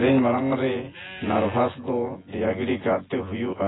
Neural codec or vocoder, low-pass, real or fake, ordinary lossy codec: vocoder, 24 kHz, 100 mel bands, Vocos; 7.2 kHz; fake; AAC, 16 kbps